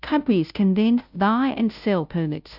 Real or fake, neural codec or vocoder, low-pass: fake; codec, 16 kHz, 0.5 kbps, FunCodec, trained on Chinese and English, 25 frames a second; 5.4 kHz